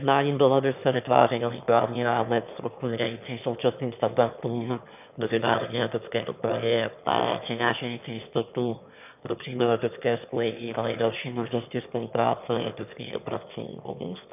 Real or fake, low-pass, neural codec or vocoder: fake; 3.6 kHz; autoencoder, 22.05 kHz, a latent of 192 numbers a frame, VITS, trained on one speaker